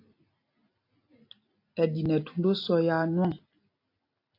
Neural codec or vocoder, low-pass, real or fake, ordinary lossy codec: none; 5.4 kHz; real; AAC, 32 kbps